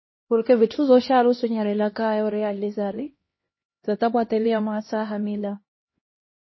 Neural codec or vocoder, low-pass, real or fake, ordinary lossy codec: codec, 16 kHz, 1 kbps, X-Codec, HuBERT features, trained on LibriSpeech; 7.2 kHz; fake; MP3, 24 kbps